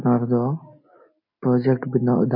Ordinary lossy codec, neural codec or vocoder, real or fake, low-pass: MP3, 24 kbps; none; real; 5.4 kHz